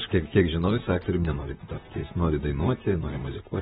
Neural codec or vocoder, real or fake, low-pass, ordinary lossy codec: vocoder, 44.1 kHz, 128 mel bands, Pupu-Vocoder; fake; 19.8 kHz; AAC, 16 kbps